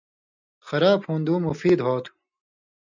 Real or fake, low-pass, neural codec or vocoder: real; 7.2 kHz; none